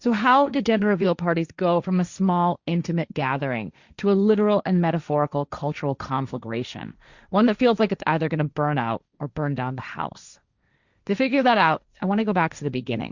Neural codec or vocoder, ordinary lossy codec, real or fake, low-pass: codec, 16 kHz, 1.1 kbps, Voila-Tokenizer; Opus, 64 kbps; fake; 7.2 kHz